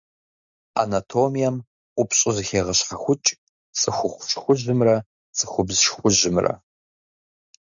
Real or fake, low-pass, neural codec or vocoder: real; 7.2 kHz; none